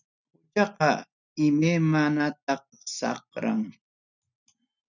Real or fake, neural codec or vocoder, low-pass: real; none; 7.2 kHz